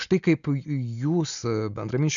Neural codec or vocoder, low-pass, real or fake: none; 7.2 kHz; real